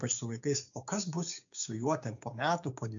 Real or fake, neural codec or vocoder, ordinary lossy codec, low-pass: fake; codec, 16 kHz, 6 kbps, DAC; MP3, 64 kbps; 7.2 kHz